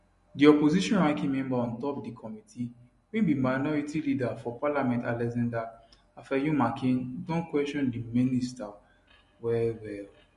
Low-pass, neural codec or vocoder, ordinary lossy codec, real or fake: 14.4 kHz; none; MP3, 48 kbps; real